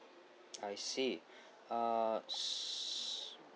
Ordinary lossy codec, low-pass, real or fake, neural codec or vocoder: none; none; real; none